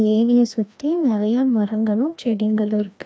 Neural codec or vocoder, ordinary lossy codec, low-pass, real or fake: codec, 16 kHz, 1 kbps, FreqCodec, larger model; none; none; fake